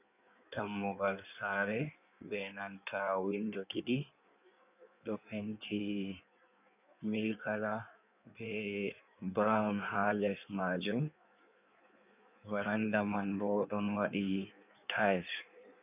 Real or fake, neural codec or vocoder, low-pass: fake; codec, 16 kHz in and 24 kHz out, 1.1 kbps, FireRedTTS-2 codec; 3.6 kHz